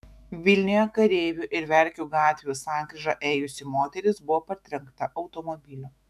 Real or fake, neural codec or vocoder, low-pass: fake; autoencoder, 48 kHz, 128 numbers a frame, DAC-VAE, trained on Japanese speech; 14.4 kHz